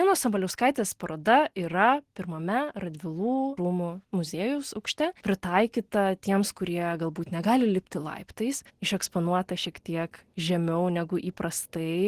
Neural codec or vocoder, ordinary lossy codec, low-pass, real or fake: none; Opus, 16 kbps; 14.4 kHz; real